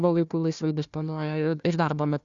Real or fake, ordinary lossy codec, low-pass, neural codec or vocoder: fake; Opus, 64 kbps; 7.2 kHz; codec, 16 kHz, 1 kbps, FunCodec, trained on Chinese and English, 50 frames a second